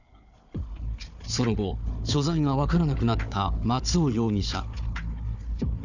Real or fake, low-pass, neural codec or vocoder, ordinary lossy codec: fake; 7.2 kHz; codec, 16 kHz, 4 kbps, FunCodec, trained on Chinese and English, 50 frames a second; none